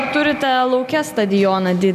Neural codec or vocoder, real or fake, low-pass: none; real; 14.4 kHz